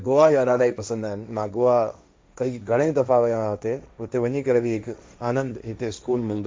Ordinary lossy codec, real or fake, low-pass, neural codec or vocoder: none; fake; none; codec, 16 kHz, 1.1 kbps, Voila-Tokenizer